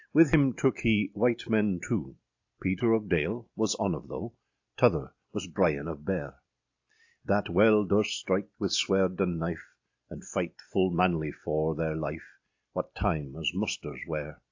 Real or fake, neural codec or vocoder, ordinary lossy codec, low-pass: real; none; AAC, 48 kbps; 7.2 kHz